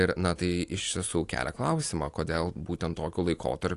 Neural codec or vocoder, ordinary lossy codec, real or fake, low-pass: none; AAC, 48 kbps; real; 10.8 kHz